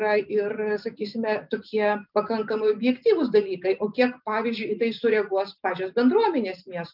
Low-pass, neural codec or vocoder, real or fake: 5.4 kHz; none; real